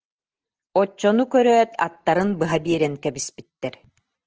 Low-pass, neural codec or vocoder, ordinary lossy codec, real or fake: 7.2 kHz; none; Opus, 16 kbps; real